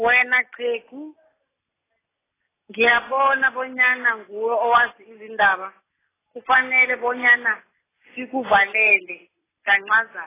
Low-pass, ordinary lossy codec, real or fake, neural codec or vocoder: 3.6 kHz; AAC, 16 kbps; real; none